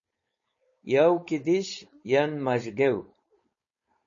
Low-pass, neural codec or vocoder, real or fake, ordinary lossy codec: 7.2 kHz; codec, 16 kHz, 4.8 kbps, FACodec; fake; MP3, 32 kbps